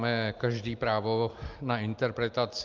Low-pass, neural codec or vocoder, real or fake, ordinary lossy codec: 7.2 kHz; none; real; Opus, 24 kbps